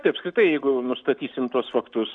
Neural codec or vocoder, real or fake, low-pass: none; real; 9.9 kHz